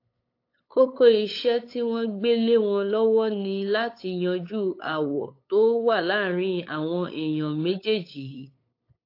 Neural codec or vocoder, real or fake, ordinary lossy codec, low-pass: codec, 16 kHz, 8 kbps, FunCodec, trained on LibriTTS, 25 frames a second; fake; AAC, 32 kbps; 5.4 kHz